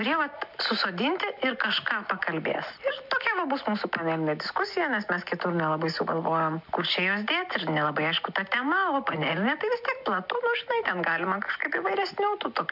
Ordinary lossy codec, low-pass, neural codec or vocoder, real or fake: MP3, 48 kbps; 5.4 kHz; none; real